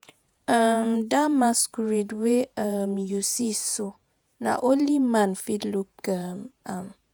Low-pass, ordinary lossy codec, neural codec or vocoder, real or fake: none; none; vocoder, 48 kHz, 128 mel bands, Vocos; fake